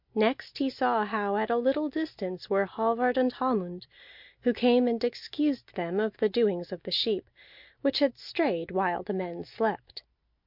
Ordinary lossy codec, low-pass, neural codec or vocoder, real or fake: MP3, 48 kbps; 5.4 kHz; none; real